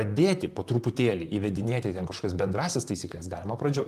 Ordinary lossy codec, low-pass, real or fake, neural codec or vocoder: Opus, 32 kbps; 14.4 kHz; fake; vocoder, 44.1 kHz, 128 mel bands, Pupu-Vocoder